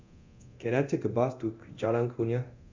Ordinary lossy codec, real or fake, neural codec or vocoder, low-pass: MP3, 64 kbps; fake; codec, 24 kHz, 0.9 kbps, DualCodec; 7.2 kHz